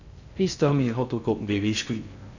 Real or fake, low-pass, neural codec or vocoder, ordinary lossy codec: fake; 7.2 kHz; codec, 16 kHz in and 24 kHz out, 0.6 kbps, FocalCodec, streaming, 2048 codes; none